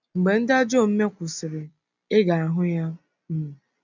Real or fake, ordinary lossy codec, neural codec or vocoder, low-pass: real; none; none; 7.2 kHz